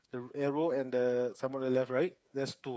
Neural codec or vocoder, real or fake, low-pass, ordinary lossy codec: codec, 16 kHz, 8 kbps, FreqCodec, smaller model; fake; none; none